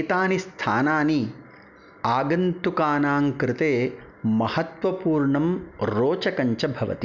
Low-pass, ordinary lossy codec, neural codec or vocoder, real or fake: 7.2 kHz; none; none; real